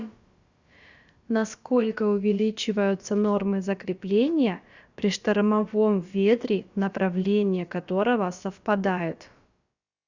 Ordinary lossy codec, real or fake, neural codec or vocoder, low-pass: Opus, 64 kbps; fake; codec, 16 kHz, about 1 kbps, DyCAST, with the encoder's durations; 7.2 kHz